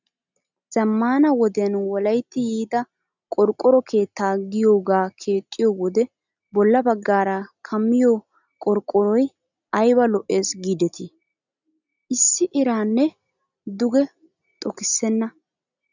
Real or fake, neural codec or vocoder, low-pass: real; none; 7.2 kHz